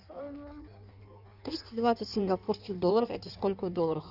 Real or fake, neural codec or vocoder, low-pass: fake; codec, 16 kHz in and 24 kHz out, 1.1 kbps, FireRedTTS-2 codec; 5.4 kHz